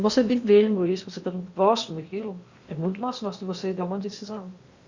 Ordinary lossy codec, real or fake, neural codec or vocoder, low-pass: Opus, 64 kbps; fake; codec, 16 kHz in and 24 kHz out, 0.8 kbps, FocalCodec, streaming, 65536 codes; 7.2 kHz